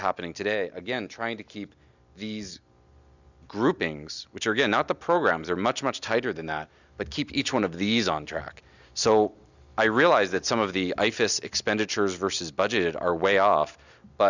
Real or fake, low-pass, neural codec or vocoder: real; 7.2 kHz; none